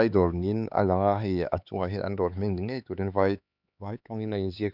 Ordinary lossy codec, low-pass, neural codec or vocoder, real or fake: none; 5.4 kHz; codec, 16 kHz, 4 kbps, X-Codec, HuBERT features, trained on LibriSpeech; fake